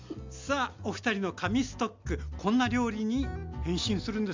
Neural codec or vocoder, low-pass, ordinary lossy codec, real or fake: none; 7.2 kHz; MP3, 64 kbps; real